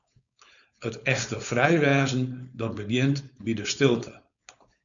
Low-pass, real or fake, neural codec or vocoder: 7.2 kHz; fake; codec, 16 kHz, 4.8 kbps, FACodec